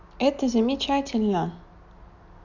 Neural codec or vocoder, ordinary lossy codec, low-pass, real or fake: none; none; 7.2 kHz; real